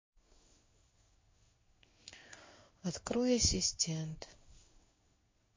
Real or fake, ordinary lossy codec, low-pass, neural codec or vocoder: fake; MP3, 32 kbps; 7.2 kHz; codec, 16 kHz in and 24 kHz out, 1 kbps, XY-Tokenizer